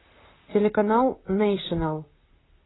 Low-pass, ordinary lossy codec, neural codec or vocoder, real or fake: 7.2 kHz; AAC, 16 kbps; vocoder, 44.1 kHz, 128 mel bands, Pupu-Vocoder; fake